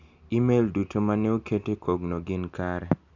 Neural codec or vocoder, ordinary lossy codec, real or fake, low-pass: none; none; real; 7.2 kHz